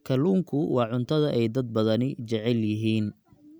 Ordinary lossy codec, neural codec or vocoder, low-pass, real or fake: none; none; none; real